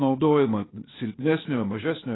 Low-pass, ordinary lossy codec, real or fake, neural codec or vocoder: 7.2 kHz; AAC, 16 kbps; fake; codec, 16 kHz, 0.8 kbps, ZipCodec